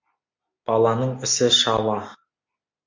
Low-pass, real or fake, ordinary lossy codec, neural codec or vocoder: 7.2 kHz; real; MP3, 64 kbps; none